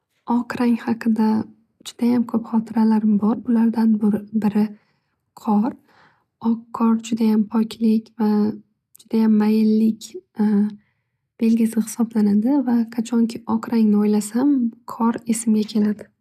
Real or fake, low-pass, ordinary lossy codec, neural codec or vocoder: real; 14.4 kHz; none; none